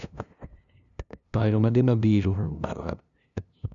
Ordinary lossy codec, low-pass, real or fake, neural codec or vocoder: none; 7.2 kHz; fake; codec, 16 kHz, 0.5 kbps, FunCodec, trained on LibriTTS, 25 frames a second